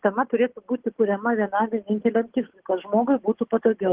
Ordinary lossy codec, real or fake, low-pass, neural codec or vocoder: Opus, 16 kbps; real; 3.6 kHz; none